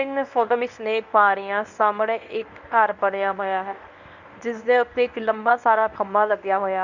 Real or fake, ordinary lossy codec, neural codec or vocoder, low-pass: fake; Opus, 64 kbps; codec, 24 kHz, 0.9 kbps, WavTokenizer, medium speech release version 1; 7.2 kHz